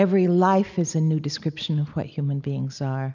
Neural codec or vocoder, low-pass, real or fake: none; 7.2 kHz; real